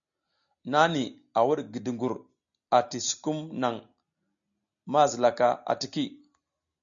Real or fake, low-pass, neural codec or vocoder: real; 7.2 kHz; none